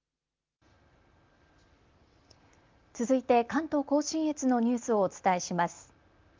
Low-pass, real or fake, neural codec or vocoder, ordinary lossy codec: 7.2 kHz; real; none; Opus, 32 kbps